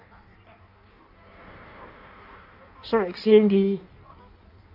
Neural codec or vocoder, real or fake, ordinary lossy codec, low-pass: codec, 16 kHz in and 24 kHz out, 1.1 kbps, FireRedTTS-2 codec; fake; none; 5.4 kHz